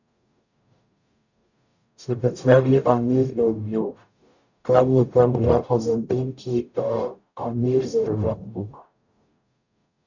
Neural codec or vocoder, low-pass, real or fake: codec, 44.1 kHz, 0.9 kbps, DAC; 7.2 kHz; fake